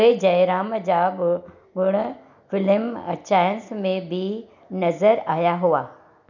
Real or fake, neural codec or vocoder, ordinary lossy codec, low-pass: real; none; none; 7.2 kHz